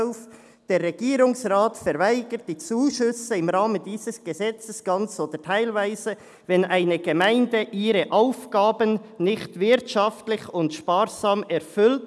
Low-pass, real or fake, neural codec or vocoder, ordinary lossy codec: none; real; none; none